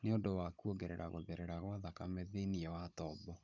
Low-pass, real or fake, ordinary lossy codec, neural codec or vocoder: 7.2 kHz; fake; none; codec, 16 kHz, 16 kbps, FunCodec, trained on Chinese and English, 50 frames a second